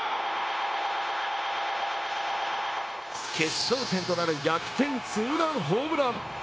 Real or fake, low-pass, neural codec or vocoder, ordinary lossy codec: fake; none; codec, 16 kHz, 0.9 kbps, LongCat-Audio-Codec; none